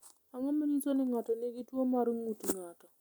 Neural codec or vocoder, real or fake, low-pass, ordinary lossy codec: none; real; 19.8 kHz; none